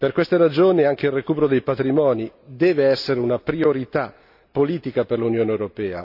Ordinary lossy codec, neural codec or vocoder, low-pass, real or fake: none; none; 5.4 kHz; real